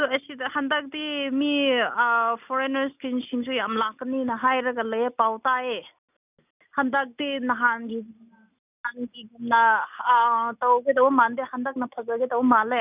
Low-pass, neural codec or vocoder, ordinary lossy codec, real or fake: 3.6 kHz; none; none; real